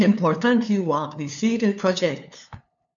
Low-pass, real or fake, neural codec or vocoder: 7.2 kHz; fake; codec, 16 kHz, 2 kbps, FunCodec, trained on LibriTTS, 25 frames a second